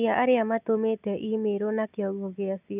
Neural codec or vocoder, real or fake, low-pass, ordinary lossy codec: none; real; 3.6 kHz; none